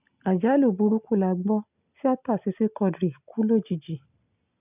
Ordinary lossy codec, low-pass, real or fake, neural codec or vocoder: none; 3.6 kHz; real; none